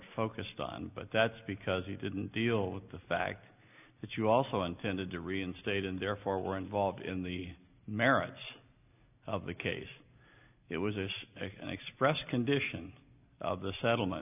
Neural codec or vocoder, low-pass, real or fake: none; 3.6 kHz; real